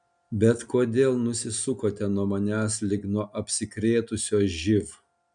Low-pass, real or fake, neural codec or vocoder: 9.9 kHz; real; none